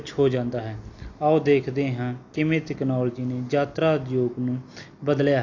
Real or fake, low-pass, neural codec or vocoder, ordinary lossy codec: real; 7.2 kHz; none; AAC, 48 kbps